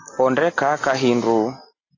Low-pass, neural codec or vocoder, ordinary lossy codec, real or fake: 7.2 kHz; none; AAC, 32 kbps; real